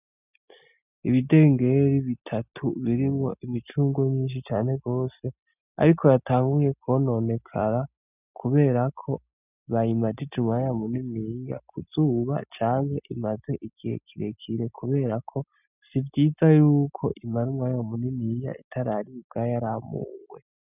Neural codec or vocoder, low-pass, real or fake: none; 3.6 kHz; real